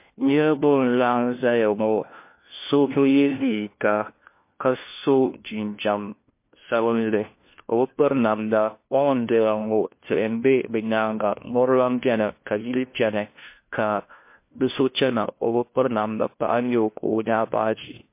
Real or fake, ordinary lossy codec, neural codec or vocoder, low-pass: fake; MP3, 24 kbps; codec, 16 kHz, 1 kbps, FunCodec, trained on LibriTTS, 50 frames a second; 3.6 kHz